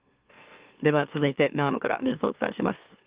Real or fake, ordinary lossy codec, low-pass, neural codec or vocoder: fake; Opus, 32 kbps; 3.6 kHz; autoencoder, 44.1 kHz, a latent of 192 numbers a frame, MeloTTS